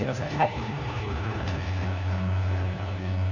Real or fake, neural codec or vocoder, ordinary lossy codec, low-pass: fake; codec, 16 kHz, 1 kbps, FunCodec, trained on LibriTTS, 50 frames a second; none; 7.2 kHz